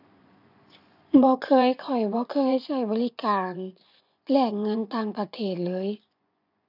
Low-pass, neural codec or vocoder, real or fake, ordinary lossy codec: 5.4 kHz; vocoder, 24 kHz, 100 mel bands, Vocos; fake; none